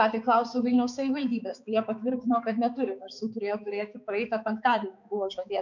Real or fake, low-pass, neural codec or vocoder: fake; 7.2 kHz; codec, 16 kHz, 4 kbps, X-Codec, HuBERT features, trained on balanced general audio